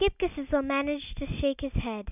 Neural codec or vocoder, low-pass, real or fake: none; 3.6 kHz; real